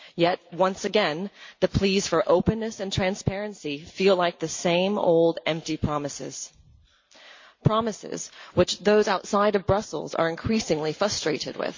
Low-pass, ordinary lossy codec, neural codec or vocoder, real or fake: 7.2 kHz; MP3, 48 kbps; none; real